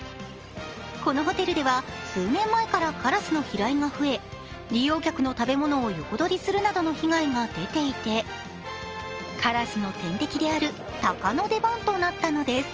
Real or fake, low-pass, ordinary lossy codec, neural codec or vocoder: real; 7.2 kHz; Opus, 24 kbps; none